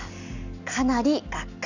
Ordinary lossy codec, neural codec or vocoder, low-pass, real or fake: none; none; 7.2 kHz; real